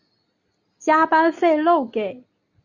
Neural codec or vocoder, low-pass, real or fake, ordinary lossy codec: none; 7.2 kHz; real; Opus, 64 kbps